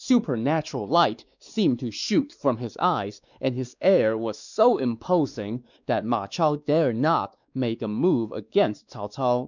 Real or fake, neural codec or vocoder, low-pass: fake; codec, 16 kHz, 2 kbps, X-Codec, WavLM features, trained on Multilingual LibriSpeech; 7.2 kHz